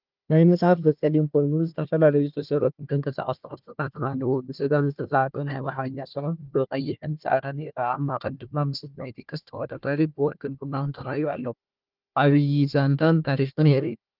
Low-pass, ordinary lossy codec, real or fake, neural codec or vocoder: 5.4 kHz; Opus, 24 kbps; fake; codec, 16 kHz, 1 kbps, FunCodec, trained on Chinese and English, 50 frames a second